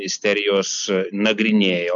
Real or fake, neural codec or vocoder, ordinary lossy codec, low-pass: real; none; MP3, 96 kbps; 7.2 kHz